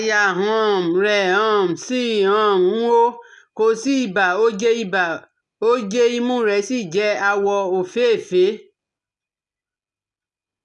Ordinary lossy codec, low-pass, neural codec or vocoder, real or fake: none; 10.8 kHz; none; real